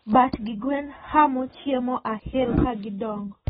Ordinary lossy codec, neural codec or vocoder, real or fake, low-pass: AAC, 16 kbps; none; real; 19.8 kHz